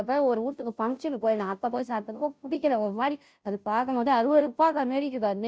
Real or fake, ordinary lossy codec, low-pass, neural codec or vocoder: fake; none; none; codec, 16 kHz, 0.5 kbps, FunCodec, trained on Chinese and English, 25 frames a second